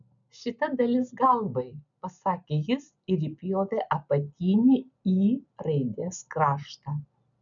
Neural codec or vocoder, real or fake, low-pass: none; real; 7.2 kHz